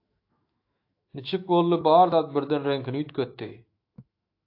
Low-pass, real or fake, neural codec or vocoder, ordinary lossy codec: 5.4 kHz; fake; autoencoder, 48 kHz, 128 numbers a frame, DAC-VAE, trained on Japanese speech; AAC, 32 kbps